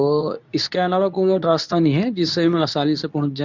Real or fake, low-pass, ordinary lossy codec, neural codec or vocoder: fake; 7.2 kHz; none; codec, 24 kHz, 0.9 kbps, WavTokenizer, medium speech release version 2